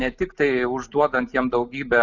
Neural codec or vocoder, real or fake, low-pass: none; real; 7.2 kHz